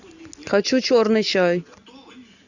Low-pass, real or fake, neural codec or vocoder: 7.2 kHz; real; none